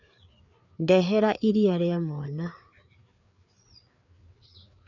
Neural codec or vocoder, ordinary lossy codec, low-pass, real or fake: codec, 16 kHz, 4 kbps, FreqCodec, larger model; none; 7.2 kHz; fake